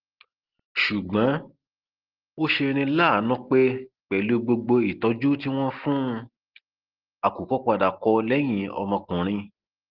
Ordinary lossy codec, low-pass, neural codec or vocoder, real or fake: Opus, 16 kbps; 5.4 kHz; none; real